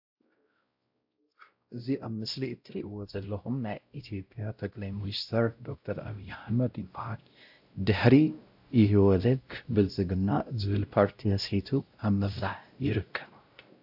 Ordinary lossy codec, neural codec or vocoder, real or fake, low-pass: AAC, 48 kbps; codec, 16 kHz, 0.5 kbps, X-Codec, WavLM features, trained on Multilingual LibriSpeech; fake; 5.4 kHz